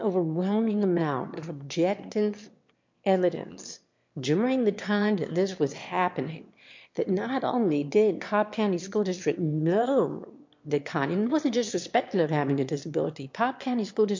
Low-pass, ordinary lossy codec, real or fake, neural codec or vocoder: 7.2 kHz; MP3, 48 kbps; fake; autoencoder, 22.05 kHz, a latent of 192 numbers a frame, VITS, trained on one speaker